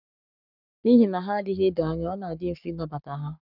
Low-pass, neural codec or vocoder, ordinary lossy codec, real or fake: 5.4 kHz; codec, 44.1 kHz, 7.8 kbps, Pupu-Codec; none; fake